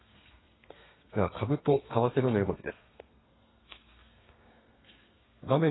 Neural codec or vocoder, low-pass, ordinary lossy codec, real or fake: codec, 32 kHz, 1.9 kbps, SNAC; 7.2 kHz; AAC, 16 kbps; fake